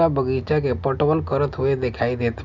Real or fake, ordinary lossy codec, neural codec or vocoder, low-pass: real; none; none; 7.2 kHz